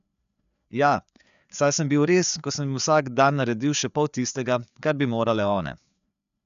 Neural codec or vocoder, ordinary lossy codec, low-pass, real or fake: codec, 16 kHz, 4 kbps, FreqCodec, larger model; none; 7.2 kHz; fake